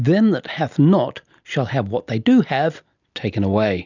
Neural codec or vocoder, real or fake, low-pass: none; real; 7.2 kHz